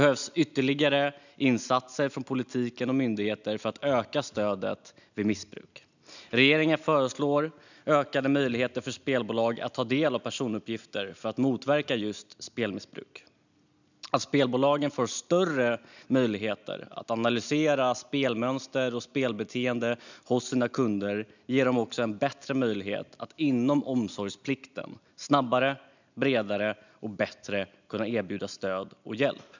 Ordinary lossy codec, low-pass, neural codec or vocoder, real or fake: none; 7.2 kHz; none; real